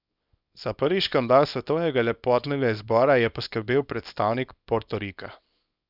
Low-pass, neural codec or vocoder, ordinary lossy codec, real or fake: 5.4 kHz; codec, 24 kHz, 0.9 kbps, WavTokenizer, small release; none; fake